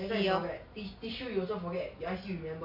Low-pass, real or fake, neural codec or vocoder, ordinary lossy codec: 5.4 kHz; real; none; MP3, 32 kbps